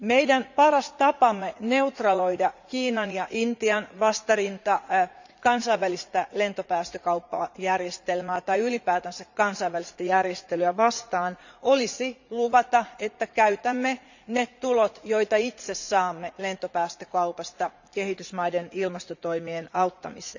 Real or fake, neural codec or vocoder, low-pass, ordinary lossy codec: fake; vocoder, 44.1 kHz, 80 mel bands, Vocos; 7.2 kHz; none